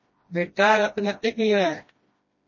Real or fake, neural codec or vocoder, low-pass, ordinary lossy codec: fake; codec, 16 kHz, 1 kbps, FreqCodec, smaller model; 7.2 kHz; MP3, 32 kbps